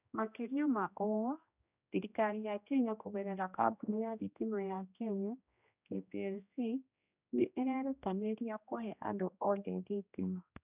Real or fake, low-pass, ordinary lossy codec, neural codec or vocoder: fake; 3.6 kHz; none; codec, 16 kHz, 1 kbps, X-Codec, HuBERT features, trained on general audio